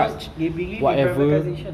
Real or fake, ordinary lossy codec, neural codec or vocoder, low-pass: real; none; none; 14.4 kHz